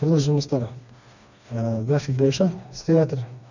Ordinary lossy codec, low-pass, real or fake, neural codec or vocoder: none; 7.2 kHz; fake; codec, 16 kHz, 2 kbps, FreqCodec, smaller model